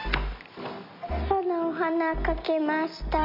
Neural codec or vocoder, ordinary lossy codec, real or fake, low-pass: none; MP3, 48 kbps; real; 5.4 kHz